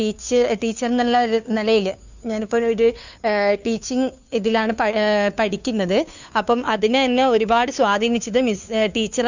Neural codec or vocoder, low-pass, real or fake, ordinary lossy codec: codec, 16 kHz, 2 kbps, FunCodec, trained on Chinese and English, 25 frames a second; 7.2 kHz; fake; none